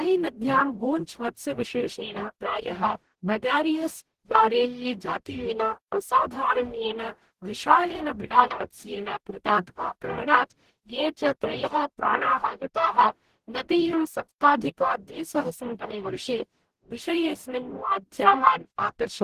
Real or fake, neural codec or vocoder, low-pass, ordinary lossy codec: fake; codec, 44.1 kHz, 0.9 kbps, DAC; 14.4 kHz; Opus, 16 kbps